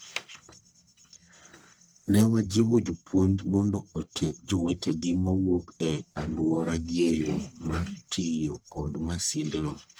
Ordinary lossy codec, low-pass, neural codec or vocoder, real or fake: none; none; codec, 44.1 kHz, 1.7 kbps, Pupu-Codec; fake